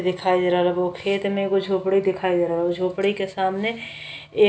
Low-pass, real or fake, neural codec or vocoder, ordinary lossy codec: none; real; none; none